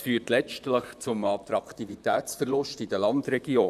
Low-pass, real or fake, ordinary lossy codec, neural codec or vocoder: 14.4 kHz; fake; none; vocoder, 44.1 kHz, 128 mel bands, Pupu-Vocoder